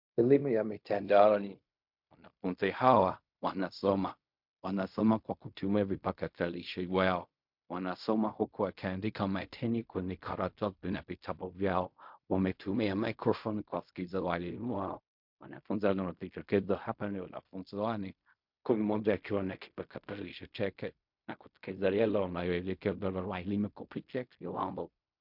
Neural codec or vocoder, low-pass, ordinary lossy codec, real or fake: codec, 16 kHz in and 24 kHz out, 0.4 kbps, LongCat-Audio-Codec, fine tuned four codebook decoder; 5.4 kHz; MP3, 48 kbps; fake